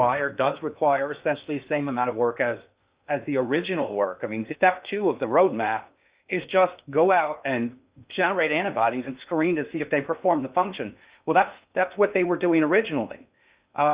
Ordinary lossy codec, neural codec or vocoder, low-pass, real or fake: Opus, 64 kbps; codec, 16 kHz in and 24 kHz out, 0.8 kbps, FocalCodec, streaming, 65536 codes; 3.6 kHz; fake